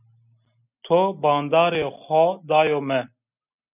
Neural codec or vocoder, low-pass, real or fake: none; 3.6 kHz; real